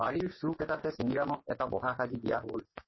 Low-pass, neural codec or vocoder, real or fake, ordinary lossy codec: 7.2 kHz; vocoder, 24 kHz, 100 mel bands, Vocos; fake; MP3, 24 kbps